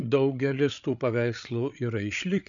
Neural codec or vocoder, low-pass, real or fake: codec, 16 kHz, 8 kbps, FreqCodec, larger model; 7.2 kHz; fake